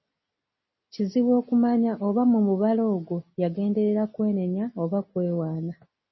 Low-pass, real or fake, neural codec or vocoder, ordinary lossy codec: 7.2 kHz; real; none; MP3, 24 kbps